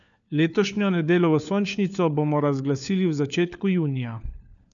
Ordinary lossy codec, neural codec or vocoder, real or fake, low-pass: none; codec, 16 kHz, 4 kbps, FunCodec, trained on LibriTTS, 50 frames a second; fake; 7.2 kHz